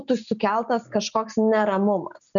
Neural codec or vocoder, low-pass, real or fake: none; 7.2 kHz; real